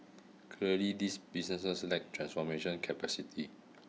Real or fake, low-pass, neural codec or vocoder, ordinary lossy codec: real; none; none; none